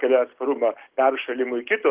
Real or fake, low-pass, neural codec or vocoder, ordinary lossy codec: real; 3.6 kHz; none; Opus, 16 kbps